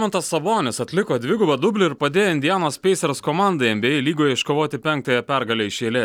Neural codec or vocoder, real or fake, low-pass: none; real; 19.8 kHz